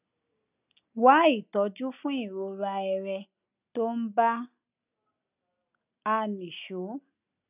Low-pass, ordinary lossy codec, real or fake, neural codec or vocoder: 3.6 kHz; none; real; none